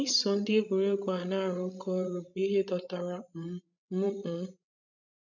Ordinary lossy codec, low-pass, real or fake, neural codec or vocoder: none; 7.2 kHz; fake; codec, 16 kHz, 16 kbps, FreqCodec, larger model